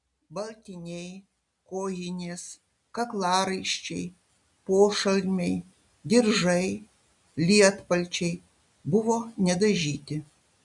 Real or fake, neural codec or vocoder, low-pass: real; none; 10.8 kHz